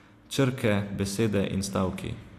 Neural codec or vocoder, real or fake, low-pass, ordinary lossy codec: none; real; 14.4 kHz; AAC, 64 kbps